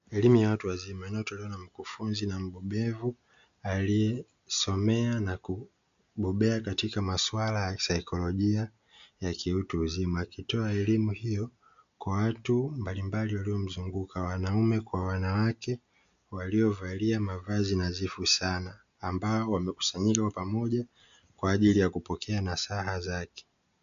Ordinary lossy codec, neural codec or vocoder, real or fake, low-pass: MP3, 64 kbps; none; real; 7.2 kHz